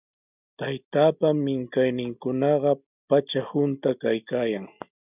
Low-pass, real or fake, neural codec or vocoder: 3.6 kHz; real; none